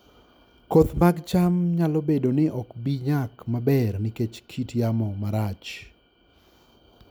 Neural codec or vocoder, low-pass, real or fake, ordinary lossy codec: none; none; real; none